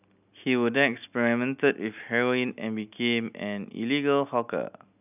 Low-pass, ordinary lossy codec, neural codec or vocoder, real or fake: 3.6 kHz; none; none; real